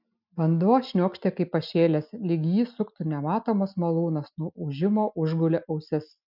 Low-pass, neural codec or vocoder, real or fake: 5.4 kHz; none; real